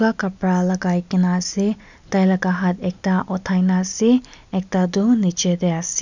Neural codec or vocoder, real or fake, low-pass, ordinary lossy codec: none; real; 7.2 kHz; none